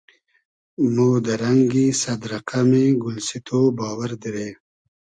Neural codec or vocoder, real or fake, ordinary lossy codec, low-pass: none; real; Opus, 64 kbps; 9.9 kHz